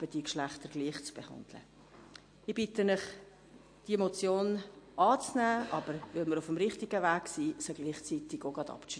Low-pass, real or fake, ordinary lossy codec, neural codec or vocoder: 9.9 kHz; real; MP3, 48 kbps; none